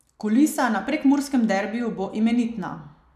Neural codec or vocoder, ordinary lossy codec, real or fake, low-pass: none; none; real; 14.4 kHz